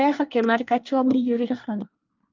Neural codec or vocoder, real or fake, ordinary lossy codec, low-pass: codec, 24 kHz, 1 kbps, SNAC; fake; Opus, 24 kbps; 7.2 kHz